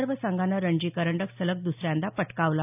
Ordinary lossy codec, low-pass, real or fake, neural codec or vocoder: none; 3.6 kHz; real; none